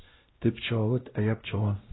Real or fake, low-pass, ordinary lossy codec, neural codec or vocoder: fake; 7.2 kHz; AAC, 16 kbps; codec, 16 kHz, 0.5 kbps, X-Codec, WavLM features, trained on Multilingual LibriSpeech